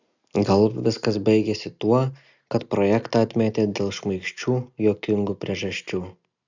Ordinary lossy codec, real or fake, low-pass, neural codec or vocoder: Opus, 64 kbps; real; 7.2 kHz; none